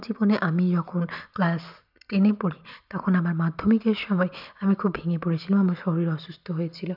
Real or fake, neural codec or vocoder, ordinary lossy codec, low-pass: real; none; none; 5.4 kHz